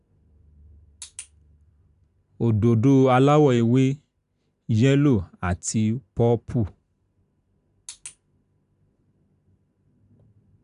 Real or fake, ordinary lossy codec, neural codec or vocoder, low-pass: real; none; none; 10.8 kHz